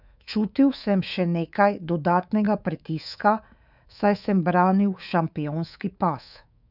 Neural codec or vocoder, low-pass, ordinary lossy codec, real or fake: codec, 24 kHz, 3.1 kbps, DualCodec; 5.4 kHz; none; fake